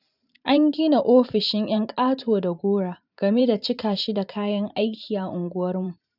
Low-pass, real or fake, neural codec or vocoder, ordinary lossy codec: 5.4 kHz; fake; vocoder, 44.1 kHz, 128 mel bands every 512 samples, BigVGAN v2; none